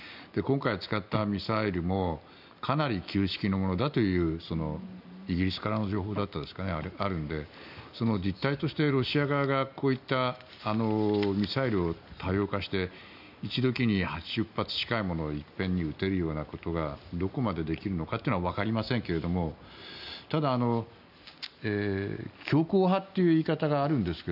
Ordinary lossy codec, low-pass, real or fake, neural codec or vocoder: none; 5.4 kHz; real; none